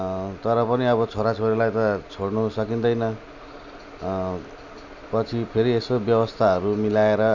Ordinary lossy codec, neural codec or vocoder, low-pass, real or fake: none; none; 7.2 kHz; real